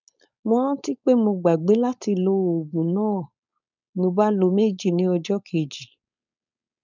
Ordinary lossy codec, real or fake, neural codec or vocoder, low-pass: none; fake; codec, 16 kHz, 4.8 kbps, FACodec; 7.2 kHz